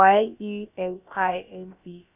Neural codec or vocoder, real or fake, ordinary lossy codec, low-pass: codec, 16 kHz, about 1 kbps, DyCAST, with the encoder's durations; fake; none; 3.6 kHz